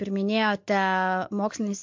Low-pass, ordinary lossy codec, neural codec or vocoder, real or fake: 7.2 kHz; MP3, 48 kbps; none; real